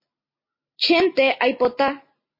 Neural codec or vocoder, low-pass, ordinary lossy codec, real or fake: none; 5.4 kHz; MP3, 24 kbps; real